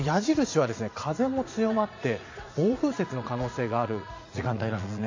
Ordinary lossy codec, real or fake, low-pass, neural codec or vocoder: AAC, 48 kbps; fake; 7.2 kHz; vocoder, 44.1 kHz, 80 mel bands, Vocos